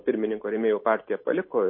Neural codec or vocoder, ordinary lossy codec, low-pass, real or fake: none; MP3, 24 kbps; 5.4 kHz; real